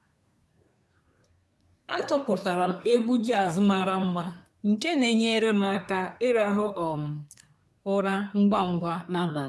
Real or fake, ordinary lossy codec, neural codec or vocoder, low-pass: fake; none; codec, 24 kHz, 1 kbps, SNAC; none